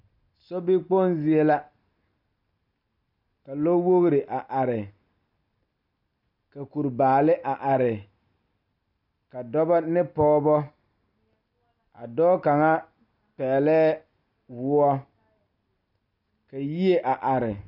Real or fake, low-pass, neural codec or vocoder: real; 5.4 kHz; none